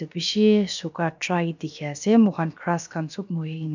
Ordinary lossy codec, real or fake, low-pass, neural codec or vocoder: none; fake; 7.2 kHz; codec, 16 kHz, about 1 kbps, DyCAST, with the encoder's durations